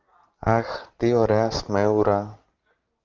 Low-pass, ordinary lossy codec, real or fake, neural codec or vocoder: 7.2 kHz; Opus, 32 kbps; real; none